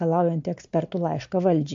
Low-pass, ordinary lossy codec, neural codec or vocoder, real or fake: 7.2 kHz; MP3, 48 kbps; none; real